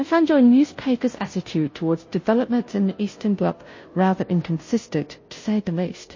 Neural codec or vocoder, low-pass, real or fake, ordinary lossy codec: codec, 16 kHz, 0.5 kbps, FunCodec, trained on Chinese and English, 25 frames a second; 7.2 kHz; fake; MP3, 32 kbps